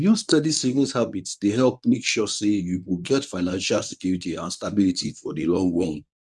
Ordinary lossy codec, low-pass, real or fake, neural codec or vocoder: none; none; fake; codec, 24 kHz, 0.9 kbps, WavTokenizer, medium speech release version 1